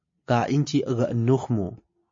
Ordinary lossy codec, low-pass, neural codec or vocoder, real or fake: MP3, 32 kbps; 7.2 kHz; none; real